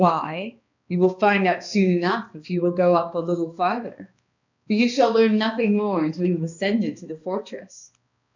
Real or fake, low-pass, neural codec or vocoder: fake; 7.2 kHz; codec, 16 kHz, 2 kbps, X-Codec, HuBERT features, trained on balanced general audio